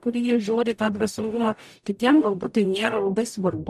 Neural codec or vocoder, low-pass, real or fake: codec, 44.1 kHz, 0.9 kbps, DAC; 14.4 kHz; fake